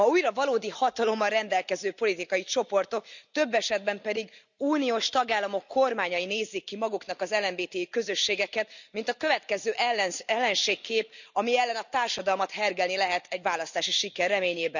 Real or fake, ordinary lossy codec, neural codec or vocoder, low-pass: real; none; none; 7.2 kHz